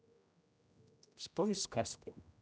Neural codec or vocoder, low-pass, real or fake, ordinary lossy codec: codec, 16 kHz, 0.5 kbps, X-Codec, HuBERT features, trained on general audio; none; fake; none